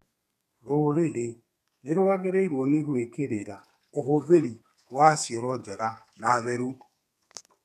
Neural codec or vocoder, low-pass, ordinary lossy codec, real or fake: codec, 32 kHz, 1.9 kbps, SNAC; 14.4 kHz; none; fake